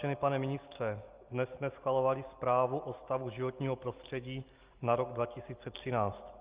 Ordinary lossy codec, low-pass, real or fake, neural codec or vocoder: Opus, 32 kbps; 3.6 kHz; real; none